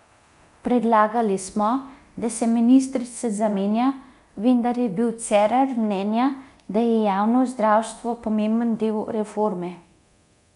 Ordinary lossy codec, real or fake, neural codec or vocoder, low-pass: none; fake; codec, 24 kHz, 0.9 kbps, DualCodec; 10.8 kHz